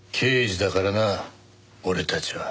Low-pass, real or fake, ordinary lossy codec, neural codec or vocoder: none; real; none; none